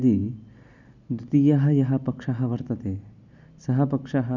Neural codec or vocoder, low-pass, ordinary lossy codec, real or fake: none; 7.2 kHz; none; real